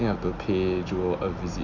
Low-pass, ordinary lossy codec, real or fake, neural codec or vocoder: 7.2 kHz; none; real; none